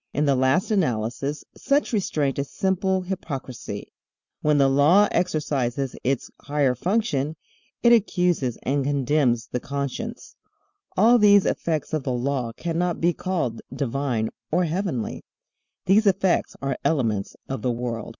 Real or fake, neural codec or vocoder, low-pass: real; none; 7.2 kHz